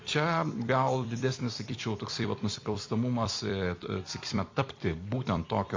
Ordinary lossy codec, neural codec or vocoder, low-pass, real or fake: MP3, 64 kbps; vocoder, 44.1 kHz, 128 mel bands every 256 samples, BigVGAN v2; 7.2 kHz; fake